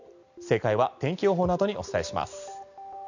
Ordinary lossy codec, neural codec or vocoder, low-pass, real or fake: none; none; 7.2 kHz; real